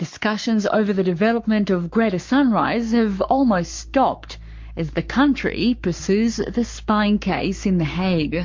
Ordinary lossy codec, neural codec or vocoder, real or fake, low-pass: MP3, 48 kbps; codec, 44.1 kHz, 7.8 kbps, Pupu-Codec; fake; 7.2 kHz